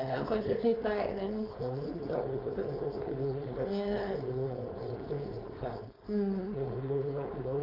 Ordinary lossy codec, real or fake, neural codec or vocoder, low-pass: AAC, 24 kbps; fake; codec, 16 kHz, 4.8 kbps, FACodec; 5.4 kHz